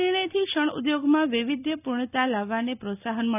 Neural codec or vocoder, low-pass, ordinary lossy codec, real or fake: none; 3.6 kHz; none; real